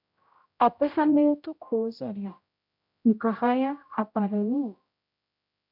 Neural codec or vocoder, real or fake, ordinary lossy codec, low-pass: codec, 16 kHz, 0.5 kbps, X-Codec, HuBERT features, trained on general audio; fake; MP3, 48 kbps; 5.4 kHz